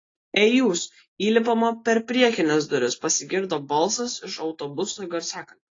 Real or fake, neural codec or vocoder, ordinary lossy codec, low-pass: real; none; AAC, 32 kbps; 7.2 kHz